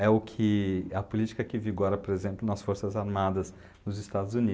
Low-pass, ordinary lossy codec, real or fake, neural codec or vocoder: none; none; real; none